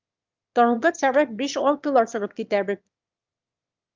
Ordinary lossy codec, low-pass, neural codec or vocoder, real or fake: Opus, 24 kbps; 7.2 kHz; autoencoder, 22.05 kHz, a latent of 192 numbers a frame, VITS, trained on one speaker; fake